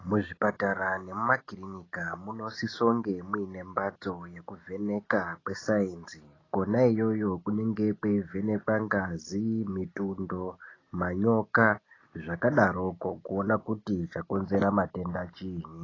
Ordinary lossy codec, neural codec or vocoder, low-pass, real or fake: AAC, 32 kbps; none; 7.2 kHz; real